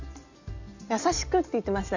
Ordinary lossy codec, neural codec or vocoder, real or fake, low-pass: Opus, 64 kbps; none; real; 7.2 kHz